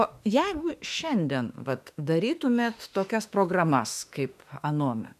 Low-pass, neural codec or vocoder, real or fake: 14.4 kHz; autoencoder, 48 kHz, 32 numbers a frame, DAC-VAE, trained on Japanese speech; fake